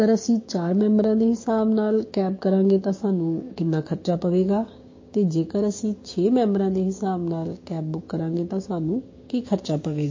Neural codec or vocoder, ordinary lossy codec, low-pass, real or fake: codec, 16 kHz, 6 kbps, DAC; MP3, 32 kbps; 7.2 kHz; fake